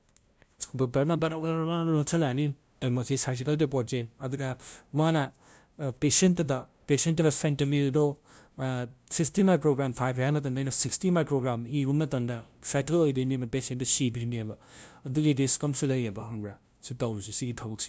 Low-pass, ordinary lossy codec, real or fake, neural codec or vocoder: none; none; fake; codec, 16 kHz, 0.5 kbps, FunCodec, trained on LibriTTS, 25 frames a second